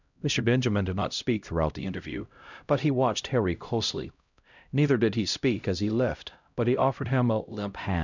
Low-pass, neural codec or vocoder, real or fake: 7.2 kHz; codec, 16 kHz, 0.5 kbps, X-Codec, HuBERT features, trained on LibriSpeech; fake